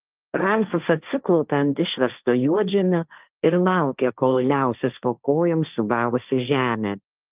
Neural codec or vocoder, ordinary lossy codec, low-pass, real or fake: codec, 16 kHz, 1.1 kbps, Voila-Tokenizer; Opus, 24 kbps; 3.6 kHz; fake